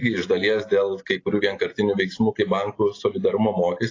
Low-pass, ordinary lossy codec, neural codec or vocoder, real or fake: 7.2 kHz; AAC, 32 kbps; none; real